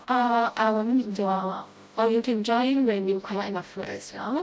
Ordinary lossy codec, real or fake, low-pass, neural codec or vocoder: none; fake; none; codec, 16 kHz, 0.5 kbps, FreqCodec, smaller model